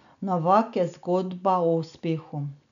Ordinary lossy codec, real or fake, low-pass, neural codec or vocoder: none; real; 7.2 kHz; none